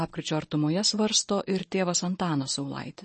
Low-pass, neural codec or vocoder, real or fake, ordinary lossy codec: 10.8 kHz; none; real; MP3, 32 kbps